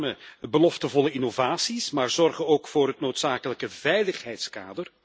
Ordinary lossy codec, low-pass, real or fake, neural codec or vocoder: none; none; real; none